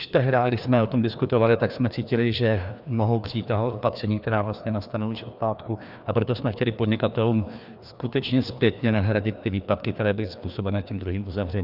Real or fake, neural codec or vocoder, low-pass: fake; codec, 16 kHz, 2 kbps, FreqCodec, larger model; 5.4 kHz